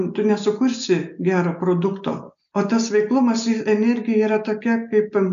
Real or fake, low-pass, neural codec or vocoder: real; 7.2 kHz; none